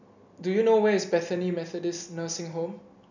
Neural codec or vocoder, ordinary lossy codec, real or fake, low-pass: none; none; real; 7.2 kHz